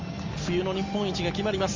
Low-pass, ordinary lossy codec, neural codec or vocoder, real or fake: 7.2 kHz; Opus, 32 kbps; none; real